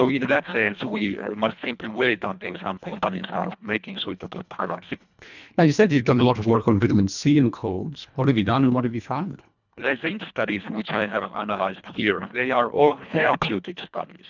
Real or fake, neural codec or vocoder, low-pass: fake; codec, 24 kHz, 1.5 kbps, HILCodec; 7.2 kHz